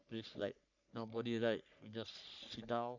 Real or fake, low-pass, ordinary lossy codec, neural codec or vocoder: fake; 7.2 kHz; none; codec, 44.1 kHz, 3.4 kbps, Pupu-Codec